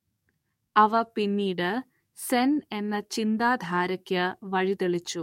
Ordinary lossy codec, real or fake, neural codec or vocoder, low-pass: MP3, 64 kbps; fake; codec, 44.1 kHz, 7.8 kbps, DAC; 19.8 kHz